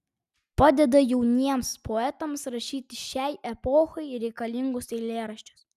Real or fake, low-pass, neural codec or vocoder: real; 14.4 kHz; none